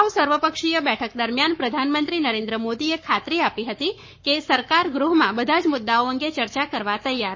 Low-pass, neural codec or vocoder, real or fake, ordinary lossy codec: 7.2 kHz; codec, 44.1 kHz, 7.8 kbps, Pupu-Codec; fake; MP3, 32 kbps